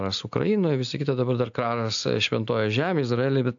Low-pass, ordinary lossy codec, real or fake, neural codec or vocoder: 7.2 kHz; AAC, 64 kbps; real; none